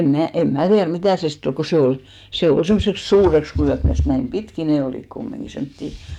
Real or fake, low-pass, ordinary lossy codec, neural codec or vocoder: fake; 19.8 kHz; none; codec, 44.1 kHz, 7.8 kbps, DAC